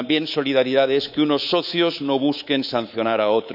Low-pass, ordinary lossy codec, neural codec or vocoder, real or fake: 5.4 kHz; none; codec, 24 kHz, 3.1 kbps, DualCodec; fake